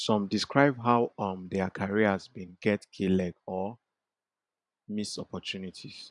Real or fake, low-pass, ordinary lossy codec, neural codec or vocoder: fake; 10.8 kHz; none; vocoder, 44.1 kHz, 128 mel bands every 512 samples, BigVGAN v2